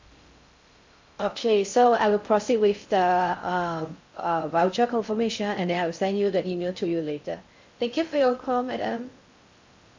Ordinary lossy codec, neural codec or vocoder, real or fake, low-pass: MP3, 48 kbps; codec, 16 kHz in and 24 kHz out, 0.6 kbps, FocalCodec, streaming, 4096 codes; fake; 7.2 kHz